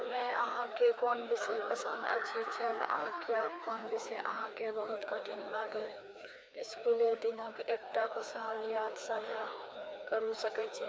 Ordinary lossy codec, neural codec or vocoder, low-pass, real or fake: none; codec, 16 kHz, 2 kbps, FreqCodec, larger model; none; fake